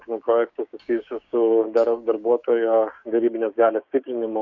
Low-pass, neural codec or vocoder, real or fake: 7.2 kHz; codec, 16 kHz, 6 kbps, DAC; fake